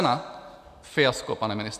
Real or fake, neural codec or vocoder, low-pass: real; none; 14.4 kHz